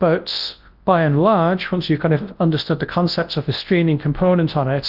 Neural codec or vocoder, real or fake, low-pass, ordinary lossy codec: codec, 24 kHz, 0.9 kbps, WavTokenizer, large speech release; fake; 5.4 kHz; Opus, 32 kbps